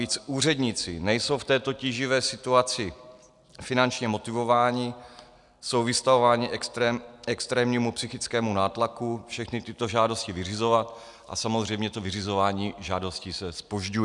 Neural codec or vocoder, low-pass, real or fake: none; 10.8 kHz; real